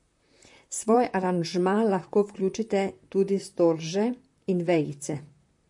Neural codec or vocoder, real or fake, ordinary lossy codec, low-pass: vocoder, 44.1 kHz, 128 mel bands, Pupu-Vocoder; fake; MP3, 48 kbps; 10.8 kHz